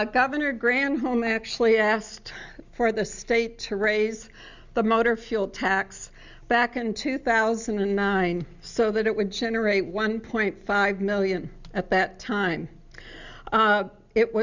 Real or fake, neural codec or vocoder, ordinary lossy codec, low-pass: real; none; Opus, 64 kbps; 7.2 kHz